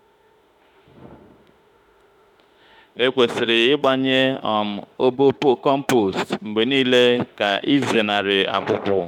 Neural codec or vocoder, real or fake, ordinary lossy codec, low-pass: autoencoder, 48 kHz, 32 numbers a frame, DAC-VAE, trained on Japanese speech; fake; none; 19.8 kHz